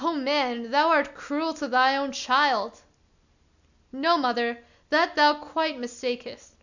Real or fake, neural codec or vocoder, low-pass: real; none; 7.2 kHz